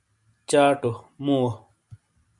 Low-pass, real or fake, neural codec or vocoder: 10.8 kHz; real; none